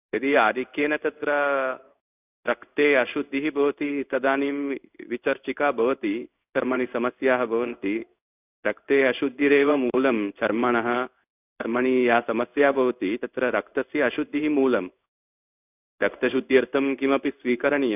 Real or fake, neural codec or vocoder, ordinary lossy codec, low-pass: fake; codec, 16 kHz in and 24 kHz out, 1 kbps, XY-Tokenizer; none; 3.6 kHz